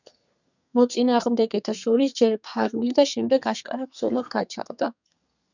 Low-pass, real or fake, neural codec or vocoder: 7.2 kHz; fake; codec, 32 kHz, 1.9 kbps, SNAC